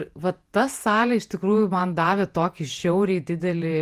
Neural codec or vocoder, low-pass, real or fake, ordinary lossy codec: vocoder, 48 kHz, 128 mel bands, Vocos; 14.4 kHz; fake; Opus, 24 kbps